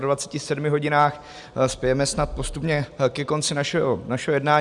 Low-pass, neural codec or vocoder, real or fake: 10.8 kHz; none; real